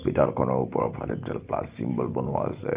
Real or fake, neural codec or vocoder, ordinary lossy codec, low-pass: real; none; Opus, 32 kbps; 3.6 kHz